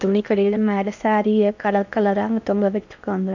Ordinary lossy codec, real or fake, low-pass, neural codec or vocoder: none; fake; 7.2 kHz; codec, 16 kHz in and 24 kHz out, 0.6 kbps, FocalCodec, streaming, 4096 codes